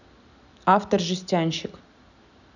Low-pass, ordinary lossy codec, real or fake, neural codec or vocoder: 7.2 kHz; none; real; none